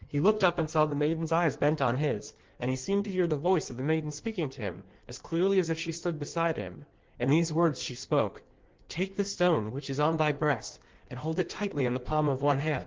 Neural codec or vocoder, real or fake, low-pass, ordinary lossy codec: codec, 16 kHz in and 24 kHz out, 1.1 kbps, FireRedTTS-2 codec; fake; 7.2 kHz; Opus, 16 kbps